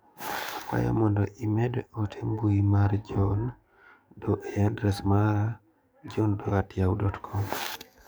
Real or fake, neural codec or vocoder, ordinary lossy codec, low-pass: fake; codec, 44.1 kHz, 7.8 kbps, DAC; none; none